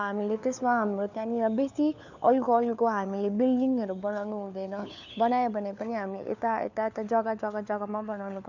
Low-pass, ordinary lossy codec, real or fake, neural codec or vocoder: 7.2 kHz; none; fake; codec, 16 kHz, 4 kbps, FunCodec, trained on LibriTTS, 50 frames a second